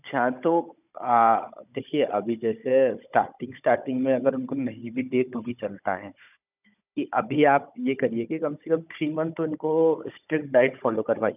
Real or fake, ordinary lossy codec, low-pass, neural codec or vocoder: fake; none; 3.6 kHz; codec, 16 kHz, 16 kbps, FunCodec, trained on Chinese and English, 50 frames a second